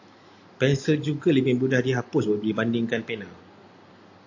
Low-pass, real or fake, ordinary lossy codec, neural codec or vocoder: 7.2 kHz; real; AAC, 48 kbps; none